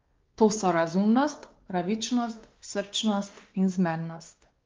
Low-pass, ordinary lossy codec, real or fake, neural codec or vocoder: 7.2 kHz; Opus, 32 kbps; fake; codec, 16 kHz, 2 kbps, X-Codec, WavLM features, trained on Multilingual LibriSpeech